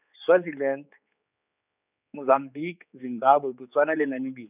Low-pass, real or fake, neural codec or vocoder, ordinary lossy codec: 3.6 kHz; fake; codec, 16 kHz, 4 kbps, X-Codec, HuBERT features, trained on general audio; none